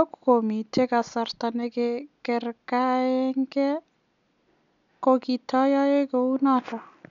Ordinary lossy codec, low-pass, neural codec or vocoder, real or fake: none; 7.2 kHz; none; real